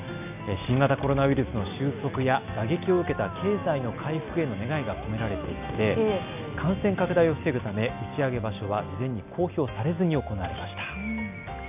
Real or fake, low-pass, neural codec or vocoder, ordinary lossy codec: real; 3.6 kHz; none; none